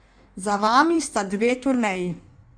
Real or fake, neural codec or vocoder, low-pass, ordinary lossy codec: fake; codec, 16 kHz in and 24 kHz out, 1.1 kbps, FireRedTTS-2 codec; 9.9 kHz; none